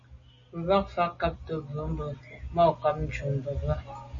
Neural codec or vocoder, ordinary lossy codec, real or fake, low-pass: none; MP3, 32 kbps; real; 7.2 kHz